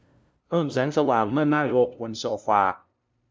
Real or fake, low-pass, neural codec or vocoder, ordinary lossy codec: fake; none; codec, 16 kHz, 0.5 kbps, FunCodec, trained on LibriTTS, 25 frames a second; none